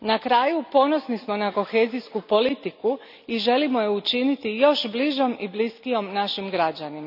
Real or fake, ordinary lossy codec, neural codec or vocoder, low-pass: real; none; none; 5.4 kHz